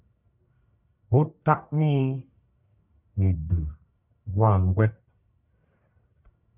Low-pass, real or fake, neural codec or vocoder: 3.6 kHz; fake; codec, 44.1 kHz, 1.7 kbps, Pupu-Codec